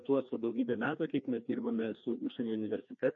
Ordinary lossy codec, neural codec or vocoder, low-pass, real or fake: MP3, 48 kbps; codec, 16 kHz, 2 kbps, FreqCodec, larger model; 7.2 kHz; fake